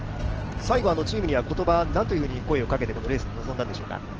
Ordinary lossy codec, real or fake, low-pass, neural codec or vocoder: Opus, 16 kbps; real; 7.2 kHz; none